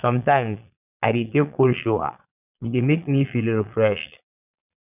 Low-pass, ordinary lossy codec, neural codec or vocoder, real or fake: 3.6 kHz; none; vocoder, 22.05 kHz, 80 mel bands, Vocos; fake